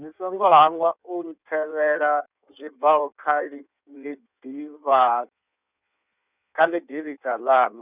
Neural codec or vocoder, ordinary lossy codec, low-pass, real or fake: codec, 16 kHz in and 24 kHz out, 1.1 kbps, FireRedTTS-2 codec; none; 3.6 kHz; fake